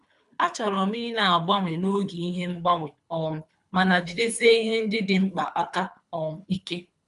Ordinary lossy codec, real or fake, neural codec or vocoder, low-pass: none; fake; codec, 24 kHz, 3 kbps, HILCodec; 10.8 kHz